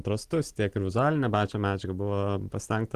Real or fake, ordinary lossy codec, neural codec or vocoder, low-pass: real; Opus, 16 kbps; none; 14.4 kHz